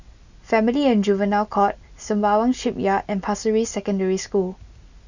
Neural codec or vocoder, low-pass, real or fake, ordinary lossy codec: none; 7.2 kHz; real; none